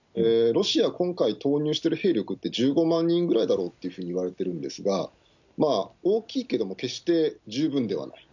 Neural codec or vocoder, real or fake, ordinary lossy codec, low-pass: none; real; none; 7.2 kHz